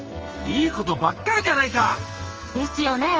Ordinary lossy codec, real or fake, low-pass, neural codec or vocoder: Opus, 24 kbps; fake; 7.2 kHz; codec, 44.1 kHz, 2.6 kbps, SNAC